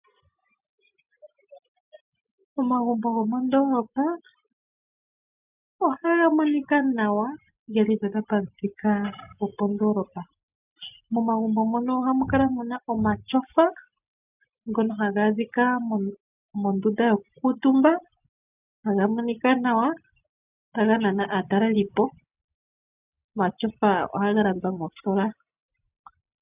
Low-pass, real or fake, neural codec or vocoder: 3.6 kHz; real; none